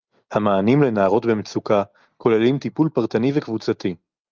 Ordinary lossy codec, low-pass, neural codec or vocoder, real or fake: Opus, 24 kbps; 7.2 kHz; none; real